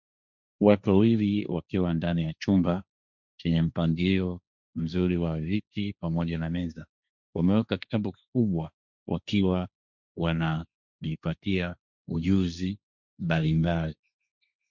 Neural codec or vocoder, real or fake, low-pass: codec, 16 kHz, 1.1 kbps, Voila-Tokenizer; fake; 7.2 kHz